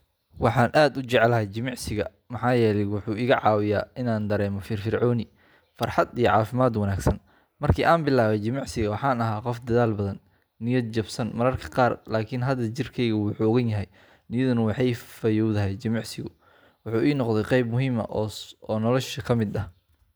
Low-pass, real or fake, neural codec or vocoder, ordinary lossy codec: none; real; none; none